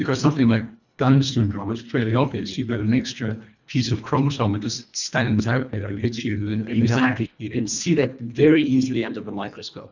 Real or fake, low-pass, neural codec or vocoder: fake; 7.2 kHz; codec, 24 kHz, 1.5 kbps, HILCodec